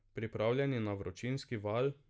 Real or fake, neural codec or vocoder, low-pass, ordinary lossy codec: real; none; none; none